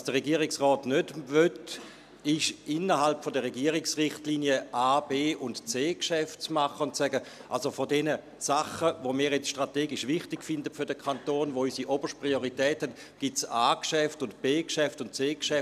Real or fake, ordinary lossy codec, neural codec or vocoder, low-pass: real; none; none; 14.4 kHz